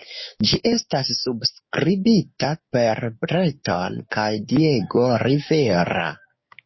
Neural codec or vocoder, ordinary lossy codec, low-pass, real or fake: codec, 16 kHz, 6 kbps, DAC; MP3, 24 kbps; 7.2 kHz; fake